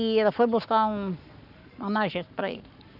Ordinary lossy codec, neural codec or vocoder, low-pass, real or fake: none; codec, 44.1 kHz, 7.8 kbps, Pupu-Codec; 5.4 kHz; fake